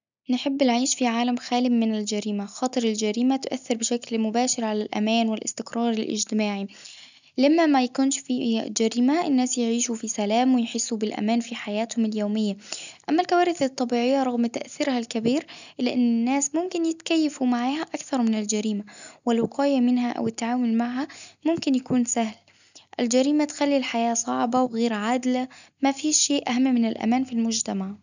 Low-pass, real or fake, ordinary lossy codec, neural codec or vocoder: 7.2 kHz; real; none; none